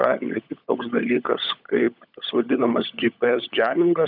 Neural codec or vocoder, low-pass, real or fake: codec, 16 kHz, 16 kbps, FunCodec, trained on LibriTTS, 50 frames a second; 5.4 kHz; fake